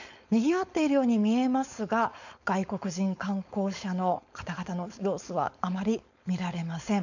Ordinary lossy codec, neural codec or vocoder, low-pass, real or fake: none; codec, 16 kHz, 4.8 kbps, FACodec; 7.2 kHz; fake